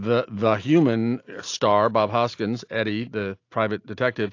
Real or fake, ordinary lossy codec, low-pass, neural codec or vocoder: real; AAC, 48 kbps; 7.2 kHz; none